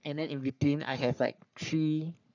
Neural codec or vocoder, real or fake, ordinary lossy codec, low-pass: codec, 44.1 kHz, 3.4 kbps, Pupu-Codec; fake; none; 7.2 kHz